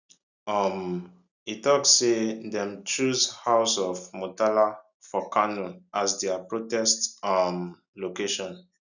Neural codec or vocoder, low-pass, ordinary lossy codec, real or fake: none; 7.2 kHz; none; real